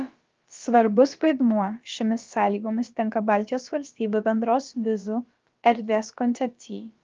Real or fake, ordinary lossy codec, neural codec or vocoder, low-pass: fake; Opus, 32 kbps; codec, 16 kHz, about 1 kbps, DyCAST, with the encoder's durations; 7.2 kHz